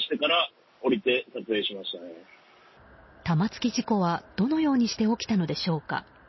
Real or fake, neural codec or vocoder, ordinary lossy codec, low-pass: real; none; MP3, 24 kbps; 7.2 kHz